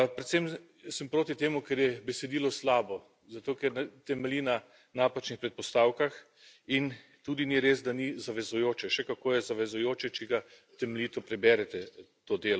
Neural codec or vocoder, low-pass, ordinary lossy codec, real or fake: none; none; none; real